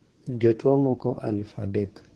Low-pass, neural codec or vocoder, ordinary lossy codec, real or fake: 10.8 kHz; codec, 24 kHz, 1 kbps, SNAC; Opus, 16 kbps; fake